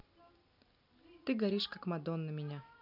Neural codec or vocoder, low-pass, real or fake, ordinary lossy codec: none; 5.4 kHz; real; none